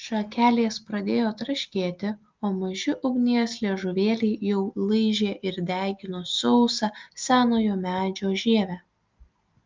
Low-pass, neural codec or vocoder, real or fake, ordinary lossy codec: 7.2 kHz; none; real; Opus, 24 kbps